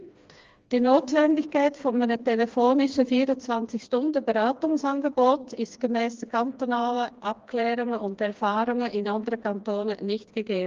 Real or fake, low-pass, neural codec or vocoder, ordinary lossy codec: fake; 7.2 kHz; codec, 16 kHz, 2 kbps, FreqCodec, smaller model; Opus, 32 kbps